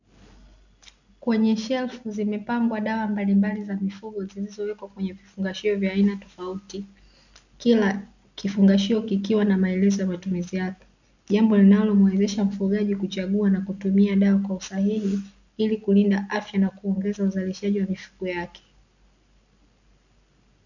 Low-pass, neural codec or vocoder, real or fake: 7.2 kHz; none; real